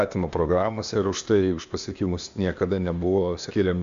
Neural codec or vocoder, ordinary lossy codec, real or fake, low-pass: codec, 16 kHz, 0.8 kbps, ZipCodec; AAC, 64 kbps; fake; 7.2 kHz